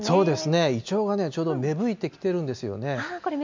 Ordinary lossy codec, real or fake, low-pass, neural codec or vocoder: MP3, 64 kbps; real; 7.2 kHz; none